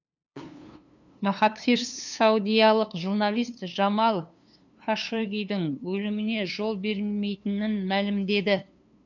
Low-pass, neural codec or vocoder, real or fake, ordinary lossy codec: 7.2 kHz; codec, 16 kHz, 2 kbps, FunCodec, trained on LibriTTS, 25 frames a second; fake; none